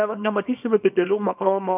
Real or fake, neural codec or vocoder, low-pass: fake; codec, 24 kHz, 0.9 kbps, WavTokenizer, small release; 3.6 kHz